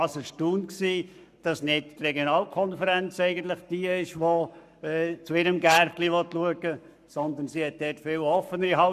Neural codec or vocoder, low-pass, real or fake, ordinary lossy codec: codec, 44.1 kHz, 7.8 kbps, Pupu-Codec; 14.4 kHz; fake; none